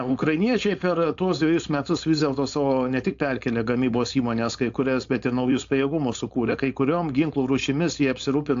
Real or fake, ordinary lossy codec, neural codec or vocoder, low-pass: fake; AAC, 64 kbps; codec, 16 kHz, 4.8 kbps, FACodec; 7.2 kHz